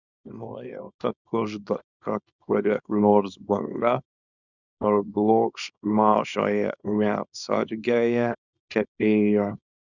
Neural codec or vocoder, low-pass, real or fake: codec, 24 kHz, 0.9 kbps, WavTokenizer, small release; 7.2 kHz; fake